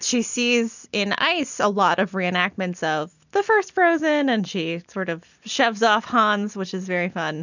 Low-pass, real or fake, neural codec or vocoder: 7.2 kHz; real; none